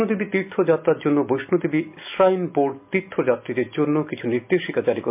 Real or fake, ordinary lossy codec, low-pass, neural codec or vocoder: real; MP3, 32 kbps; 3.6 kHz; none